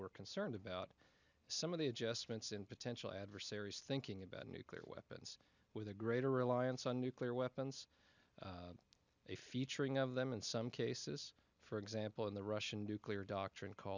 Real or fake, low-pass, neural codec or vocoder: real; 7.2 kHz; none